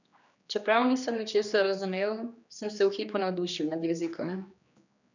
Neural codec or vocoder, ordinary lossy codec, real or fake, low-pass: codec, 16 kHz, 2 kbps, X-Codec, HuBERT features, trained on general audio; none; fake; 7.2 kHz